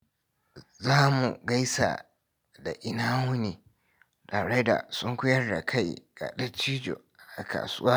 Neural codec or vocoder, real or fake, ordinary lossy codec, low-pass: none; real; none; none